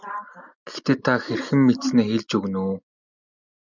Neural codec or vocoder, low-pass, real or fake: none; 7.2 kHz; real